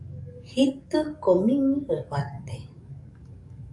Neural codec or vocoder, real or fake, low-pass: codec, 44.1 kHz, 7.8 kbps, DAC; fake; 10.8 kHz